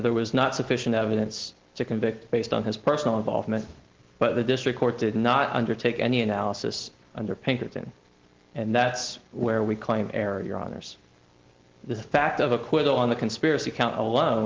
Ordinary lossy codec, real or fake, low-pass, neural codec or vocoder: Opus, 16 kbps; real; 7.2 kHz; none